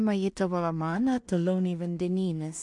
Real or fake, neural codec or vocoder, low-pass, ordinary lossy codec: fake; codec, 16 kHz in and 24 kHz out, 0.4 kbps, LongCat-Audio-Codec, two codebook decoder; 10.8 kHz; MP3, 64 kbps